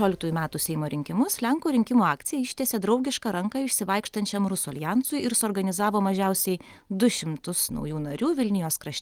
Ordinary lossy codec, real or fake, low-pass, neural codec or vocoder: Opus, 24 kbps; real; 19.8 kHz; none